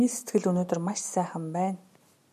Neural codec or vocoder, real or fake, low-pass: none; real; 14.4 kHz